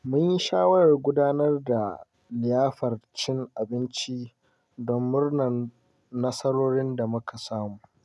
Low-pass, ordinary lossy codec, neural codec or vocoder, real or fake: none; none; none; real